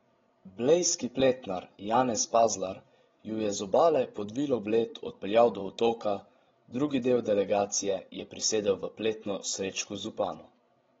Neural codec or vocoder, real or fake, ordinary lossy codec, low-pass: codec, 16 kHz, 16 kbps, FreqCodec, larger model; fake; AAC, 24 kbps; 7.2 kHz